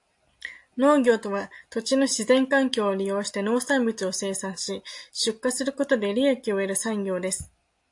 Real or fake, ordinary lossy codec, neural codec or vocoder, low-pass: real; AAC, 64 kbps; none; 10.8 kHz